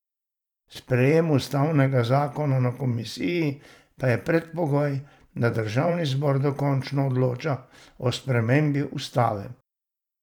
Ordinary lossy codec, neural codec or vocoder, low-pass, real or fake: none; vocoder, 44.1 kHz, 128 mel bands every 512 samples, BigVGAN v2; 19.8 kHz; fake